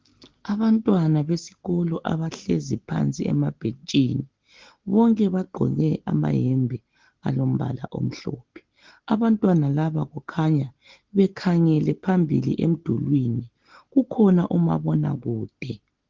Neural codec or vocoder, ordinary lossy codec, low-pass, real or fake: none; Opus, 16 kbps; 7.2 kHz; real